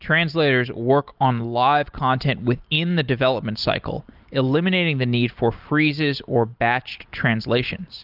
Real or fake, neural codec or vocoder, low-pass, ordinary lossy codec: real; none; 5.4 kHz; Opus, 32 kbps